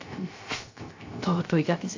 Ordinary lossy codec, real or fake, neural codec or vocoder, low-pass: AAC, 48 kbps; fake; codec, 16 kHz, 0.3 kbps, FocalCodec; 7.2 kHz